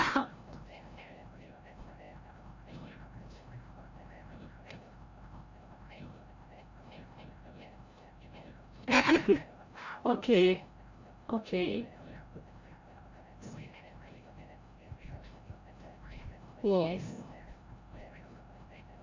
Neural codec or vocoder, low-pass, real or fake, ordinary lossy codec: codec, 16 kHz, 0.5 kbps, FreqCodec, larger model; 7.2 kHz; fake; MP3, 48 kbps